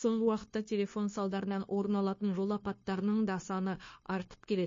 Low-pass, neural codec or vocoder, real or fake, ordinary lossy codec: 7.2 kHz; codec, 16 kHz, 0.9 kbps, LongCat-Audio-Codec; fake; MP3, 32 kbps